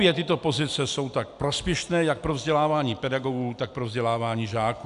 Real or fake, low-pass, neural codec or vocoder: real; 10.8 kHz; none